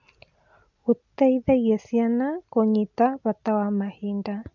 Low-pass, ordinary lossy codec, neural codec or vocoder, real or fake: 7.2 kHz; none; none; real